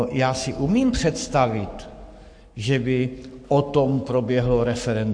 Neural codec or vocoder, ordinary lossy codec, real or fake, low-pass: codec, 44.1 kHz, 7.8 kbps, Pupu-Codec; AAC, 64 kbps; fake; 9.9 kHz